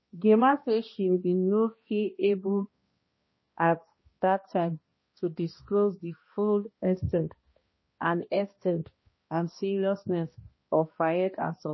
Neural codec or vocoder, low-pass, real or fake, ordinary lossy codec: codec, 16 kHz, 1 kbps, X-Codec, HuBERT features, trained on balanced general audio; 7.2 kHz; fake; MP3, 24 kbps